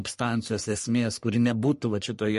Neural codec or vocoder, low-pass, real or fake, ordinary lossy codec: codec, 44.1 kHz, 3.4 kbps, Pupu-Codec; 14.4 kHz; fake; MP3, 48 kbps